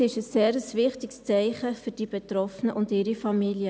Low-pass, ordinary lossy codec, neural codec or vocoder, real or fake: none; none; none; real